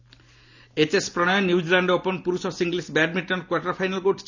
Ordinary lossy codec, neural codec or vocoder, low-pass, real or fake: none; none; 7.2 kHz; real